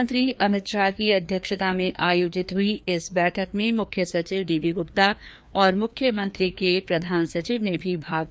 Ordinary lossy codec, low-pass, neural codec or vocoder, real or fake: none; none; codec, 16 kHz, 2 kbps, FreqCodec, larger model; fake